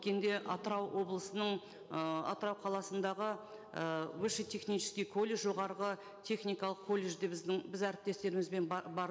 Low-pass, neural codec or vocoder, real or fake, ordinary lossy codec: none; none; real; none